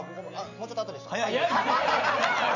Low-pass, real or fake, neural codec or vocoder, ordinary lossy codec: 7.2 kHz; real; none; none